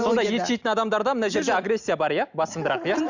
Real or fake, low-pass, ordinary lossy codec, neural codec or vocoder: real; 7.2 kHz; none; none